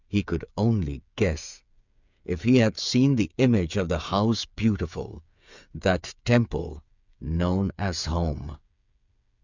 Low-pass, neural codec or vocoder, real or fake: 7.2 kHz; codec, 16 kHz, 8 kbps, FreqCodec, smaller model; fake